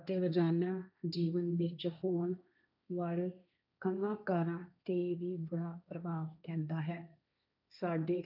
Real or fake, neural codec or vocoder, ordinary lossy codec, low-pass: fake; codec, 16 kHz, 1.1 kbps, Voila-Tokenizer; none; 5.4 kHz